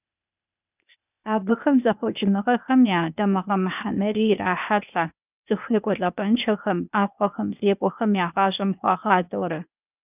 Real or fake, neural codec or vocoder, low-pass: fake; codec, 16 kHz, 0.8 kbps, ZipCodec; 3.6 kHz